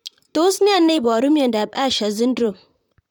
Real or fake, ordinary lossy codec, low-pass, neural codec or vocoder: fake; none; 19.8 kHz; vocoder, 44.1 kHz, 128 mel bands, Pupu-Vocoder